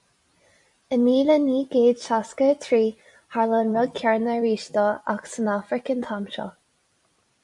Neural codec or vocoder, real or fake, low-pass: vocoder, 44.1 kHz, 128 mel bands every 256 samples, BigVGAN v2; fake; 10.8 kHz